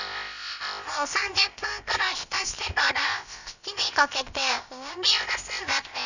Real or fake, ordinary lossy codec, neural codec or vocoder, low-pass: fake; none; codec, 16 kHz, about 1 kbps, DyCAST, with the encoder's durations; 7.2 kHz